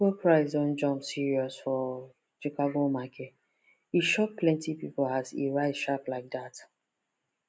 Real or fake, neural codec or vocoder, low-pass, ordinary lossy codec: real; none; none; none